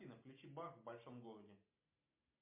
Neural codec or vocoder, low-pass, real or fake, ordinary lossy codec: none; 3.6 kHz; real; MP3, 32 kbps